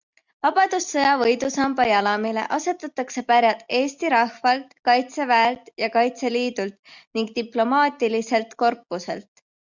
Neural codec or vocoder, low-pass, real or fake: none; 7.2 kHz; real